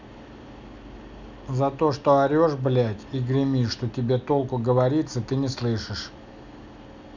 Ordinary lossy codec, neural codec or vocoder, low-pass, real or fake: none; none; 7.2 kHz; real